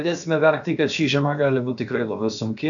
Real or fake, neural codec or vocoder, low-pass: fake; codec, 16 kHz, about 1 kbps, DyCAST, with the encoder's durations; 7.2 kHz